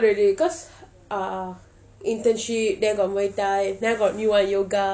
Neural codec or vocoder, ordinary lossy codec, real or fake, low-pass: none; none; real; none